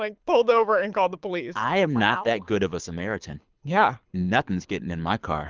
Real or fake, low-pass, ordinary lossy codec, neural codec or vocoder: fake; 7.2 kHz; Opus, 24 kbps; codec, 24 kHz, 6 kbps, HILCodec